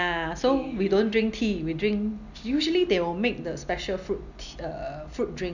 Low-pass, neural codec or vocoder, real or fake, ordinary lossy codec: 7.2 kHz; none; real; none